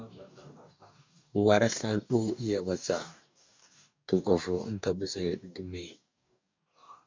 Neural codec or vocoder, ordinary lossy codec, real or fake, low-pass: codec, 44.1 kHz, 2.6 kbps, DAC; MP3, 64 kbps; fake; 7.2 kHz